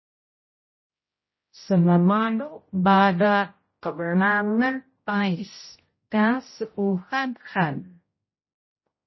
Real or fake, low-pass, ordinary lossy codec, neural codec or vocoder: fake; 7.2 kHz; MP3, 24 kbps; codec, 16 kHz, 0.5 kbps, X-Codec, HuBERT features, trained on general audio